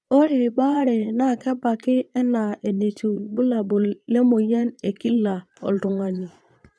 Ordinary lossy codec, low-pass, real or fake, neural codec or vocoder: none; none; fake; vocoder, 22.05 kHz, 80 mel bands, Vocos